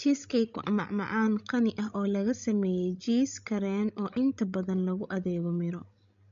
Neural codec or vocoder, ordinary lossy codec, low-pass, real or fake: codec, 16 kHz, 16 kbps, FreqCodec, larger model; MP3, 48 kbps; 7.2 kHz; fake